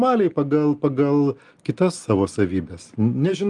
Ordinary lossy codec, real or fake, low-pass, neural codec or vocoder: Opus, 32 kbps; real; 10.8 kHz; none